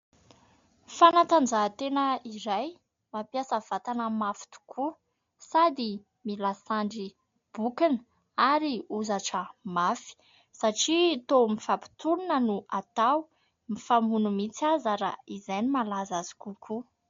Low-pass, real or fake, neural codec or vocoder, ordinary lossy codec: 7.2 kHz; real; none; MP3, 48 kbps